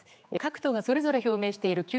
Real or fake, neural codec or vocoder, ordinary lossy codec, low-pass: fake; codec, 16 kHz, 4 kbps, X-Codec, HuBERT features, trained on general audio; none; none